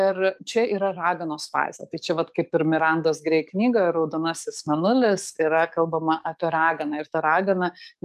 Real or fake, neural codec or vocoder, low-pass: fake; codec, 44.1 kHz, 7.8 kbps, DAC; 14.4 kHz